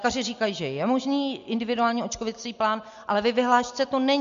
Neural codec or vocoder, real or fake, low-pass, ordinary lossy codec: none; real; 7.2 kHz; MP3, 48 kbps